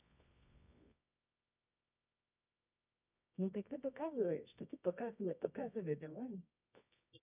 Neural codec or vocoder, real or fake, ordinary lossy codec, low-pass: codec, 24 kHz, 0.9 kbps, WavTokenizer, medium music audio release; fake; none; 3.6 kHz